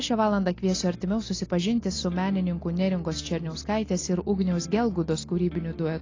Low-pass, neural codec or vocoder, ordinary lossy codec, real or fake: 7.2 kHz; none; AAC, 32 kbps; real